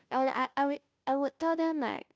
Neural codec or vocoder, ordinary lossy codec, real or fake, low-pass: codec, 16 kHz, 0.5 kbps, FunCodec, trained on Chinese and English, 25 frames a second; none; fake; none